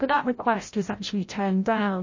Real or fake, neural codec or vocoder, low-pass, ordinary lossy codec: fake; codec, 16 kHz, 0.5 kbps, FreqCodec, larger model; 7.2 kHz; MP3, 32 kbps